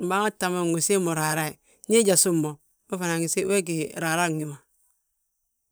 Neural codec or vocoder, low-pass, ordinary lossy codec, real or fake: vocoder, 44.1 kHz, 128 mel bands every 512 samples, BigVGAN v2; none; none; fake